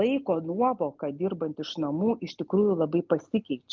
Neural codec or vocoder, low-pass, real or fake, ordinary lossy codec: none; 7.2 kHz; real; Opus, 24 kbps